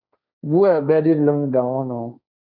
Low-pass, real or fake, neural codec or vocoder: 5.4 kHz; fake; codec, 16 kHz, 1.1 kbps, Voila-Tokenizer